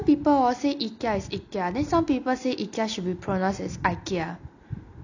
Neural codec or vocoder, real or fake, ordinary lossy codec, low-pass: none; real; none; 7.2 kHz